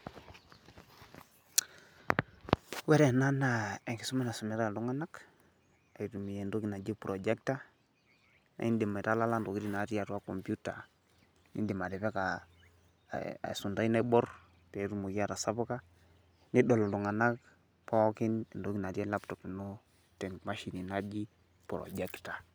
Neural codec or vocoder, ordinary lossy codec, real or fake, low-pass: none; none; real; none